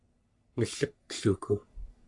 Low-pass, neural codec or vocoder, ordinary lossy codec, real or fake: 10.8 kHz; codec, 44.1 kHz, 3.4 kbps, Pupu-Codec; AAC, 64 kbps; fake